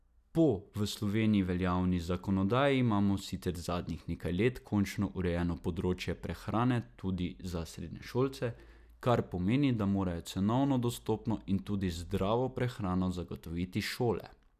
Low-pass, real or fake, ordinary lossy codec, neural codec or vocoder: 14.4 kHz; real; none; none